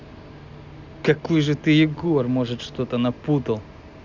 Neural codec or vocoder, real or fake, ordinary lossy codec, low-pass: none; real; Opus, 64 kbps; 7.2 kHz